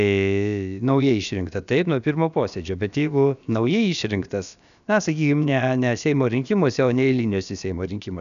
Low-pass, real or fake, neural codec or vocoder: 7.2 kHz; fake; codec, 16 kHz, about 1 kbps, DyCAST, with the encoder's durations